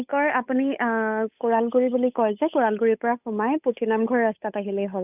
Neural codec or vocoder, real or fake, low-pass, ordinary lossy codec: codec, 16 kHz, 8 kbps, FunCodec, trained on Chinese and English, 25 frames a second; fake; 3.6 kHz; none